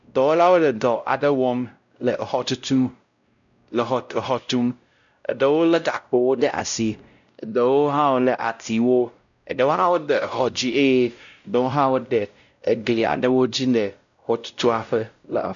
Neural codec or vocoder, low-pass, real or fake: codec, 16 kHz, 0.5 kbps, X-Codec, WavLM features, trained on Multilingual LibriSpeech; 7.2 kHz; fake